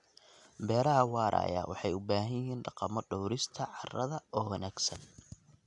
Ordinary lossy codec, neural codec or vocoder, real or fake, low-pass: none; none; real; 10.8 kHz